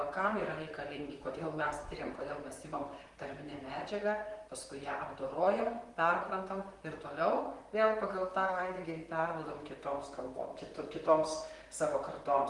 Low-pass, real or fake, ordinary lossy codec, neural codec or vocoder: 10.8 kHz; fake; Opus, 24 kbps; vocoder, 44.1 kHz, 128 mel bands, Pupu-Vocoder